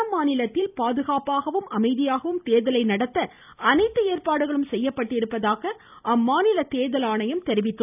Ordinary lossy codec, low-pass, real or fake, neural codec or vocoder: none; 3.6 kHz; real; none